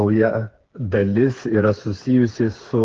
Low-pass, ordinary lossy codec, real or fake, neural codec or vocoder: 7.2 kHz; Opus, 16 kbps; fake; codec, 16 kHz, 4 kbps, FreqCodec, smaller model